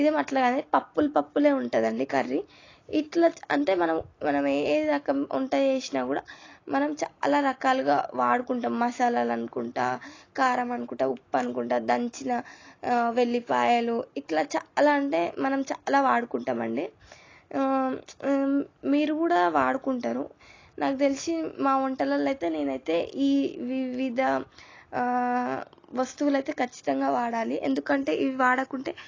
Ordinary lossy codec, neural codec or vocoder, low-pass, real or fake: AAC, 32 kbps; none; 7.2 kHz; real